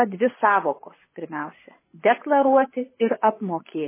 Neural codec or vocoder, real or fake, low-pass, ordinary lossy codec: vocoder, 24 kHz, 100 mel bands, Vocos; fake; 3.6 kHz; MP3, 16 kbps